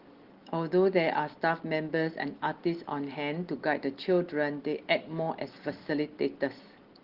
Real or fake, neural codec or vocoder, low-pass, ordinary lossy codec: real; none; 5.4 kHz; Opus, 16 kbps